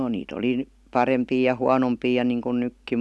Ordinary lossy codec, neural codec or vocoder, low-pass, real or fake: none; none; none; real